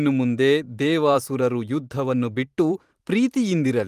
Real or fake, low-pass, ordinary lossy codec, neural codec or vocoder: fake; 14.4 kHz; Opus, 24 kbps; vocoder, 44.1 kHz, 128 mel bands every 512 samples, BigVGAN v2